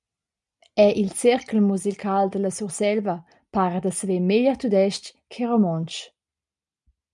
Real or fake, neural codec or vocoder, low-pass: real; none; 10.8 kHz